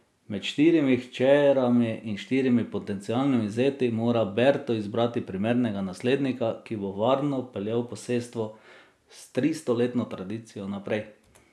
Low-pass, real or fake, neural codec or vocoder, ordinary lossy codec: none; real; none; none